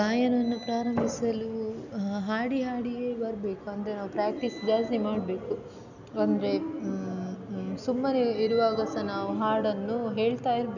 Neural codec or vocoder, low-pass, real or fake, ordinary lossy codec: none; 7.2 kHz; real; none